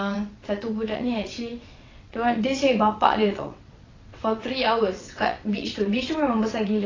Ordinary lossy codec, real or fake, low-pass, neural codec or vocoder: AAC, 32 kbps; fake; 7.2 kHz; vocoder, 22.05 kHz, 80 mel bands, WaveNeXt